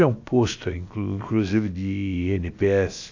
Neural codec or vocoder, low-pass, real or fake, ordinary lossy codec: codec, 16 kHz, about 1 kbps, DyCAST, with the encoder's durations; 7.2 kHz; fake; AAC, 48 kbps